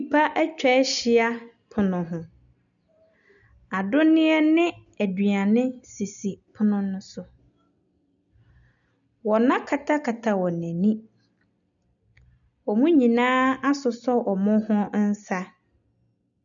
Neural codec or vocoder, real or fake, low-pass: none; real; 7.2 kHz